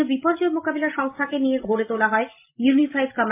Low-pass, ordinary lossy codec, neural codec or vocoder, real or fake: 3.6 kHz; MP3, 24 kbps; none; real